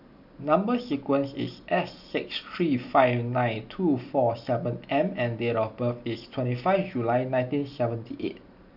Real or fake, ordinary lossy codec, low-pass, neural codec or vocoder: real; none; 5.4 kHz; none